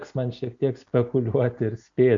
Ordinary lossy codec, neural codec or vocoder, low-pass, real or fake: MP3, 64 kbps; none; 7.2 kHz; real